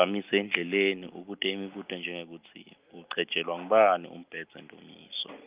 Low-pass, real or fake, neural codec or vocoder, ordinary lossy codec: 3.6 kHz; real; none; Opus, 24 kbps